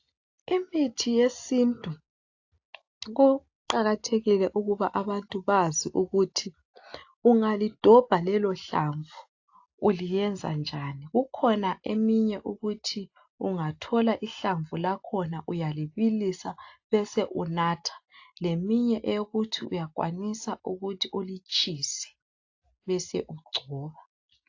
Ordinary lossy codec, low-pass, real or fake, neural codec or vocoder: AAC, 48 kbps; 7.2 kHz; real; none